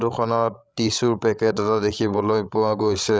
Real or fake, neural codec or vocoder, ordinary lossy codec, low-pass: fake; codec, 16 kHz, 16 kbps, FreqCodec, larger model; none; none